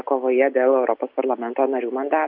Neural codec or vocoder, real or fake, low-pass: none; real; 5.4 kHz